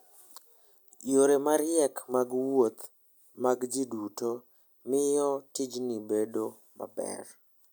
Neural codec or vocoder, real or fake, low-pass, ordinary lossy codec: none; real; none; none